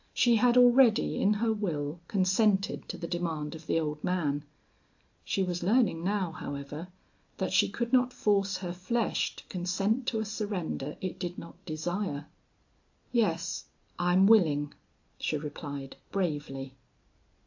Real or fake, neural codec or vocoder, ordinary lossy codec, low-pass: real; none; AAC, 48 kbps; 7.2 kHz